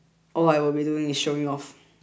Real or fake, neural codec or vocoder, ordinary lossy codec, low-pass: real; none; none; none